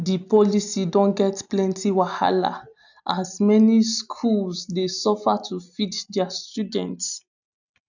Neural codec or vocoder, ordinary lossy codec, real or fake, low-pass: none; none; real; 7.2 kHz